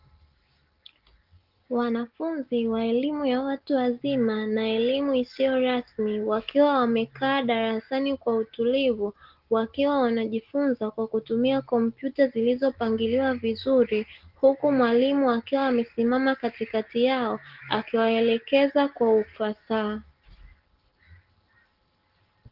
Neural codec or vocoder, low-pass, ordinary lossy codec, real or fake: none; 5.4 kHz; Opus, 16 kbps; real